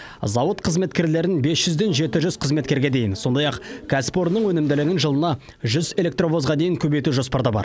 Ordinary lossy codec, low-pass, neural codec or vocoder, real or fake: none; none; none; real